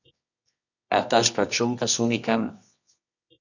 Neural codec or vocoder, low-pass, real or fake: codec, 24 kHz, 0.9 kbps, WavTokenizer, medium music audio release; 7.2 kHz; fake